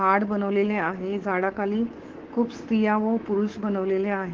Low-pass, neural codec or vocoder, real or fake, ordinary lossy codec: 7.2 kHz; codec, 24 kHz, 3.1 kbps, DualCodec; fake; Opus, 16 kbps